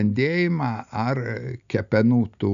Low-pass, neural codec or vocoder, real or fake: 7.2 kHz; none; real